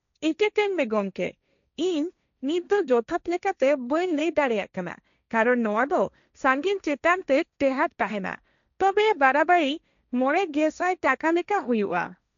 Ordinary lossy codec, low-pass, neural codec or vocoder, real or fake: none; 7.2 kHz; codec, 16 kHz, 1.1 kbps, Voila-Tokenizer; fake